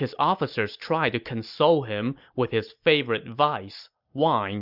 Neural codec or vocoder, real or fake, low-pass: none; real; 5.4 kHz